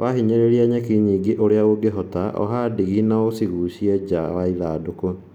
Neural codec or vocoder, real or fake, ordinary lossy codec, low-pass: none; real; none; 19.8 kHz